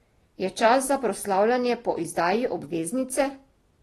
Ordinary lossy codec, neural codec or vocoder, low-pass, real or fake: AAC, 32 kbps; vocoder, 44.1 kHz, 128 mel bands every 256 samples, BigVGAN v2; 19.8 kHz; fake